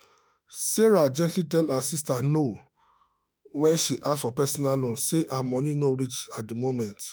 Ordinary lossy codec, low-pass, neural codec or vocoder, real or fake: none; none; autoencoder, 48 kHz, 32 numbers a frame, DAC-VAE, trained on Japanese speech; fake